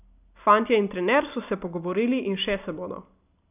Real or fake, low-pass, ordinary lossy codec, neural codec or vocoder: real; 3.6 kHz; none; none